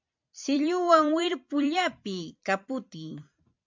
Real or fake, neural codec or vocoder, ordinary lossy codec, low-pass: fake; vocoder, 44.1 kHz, 128 mel bands every 256 samples, BigVGAN v2; MP3, 64 kbps; 7.2 kHz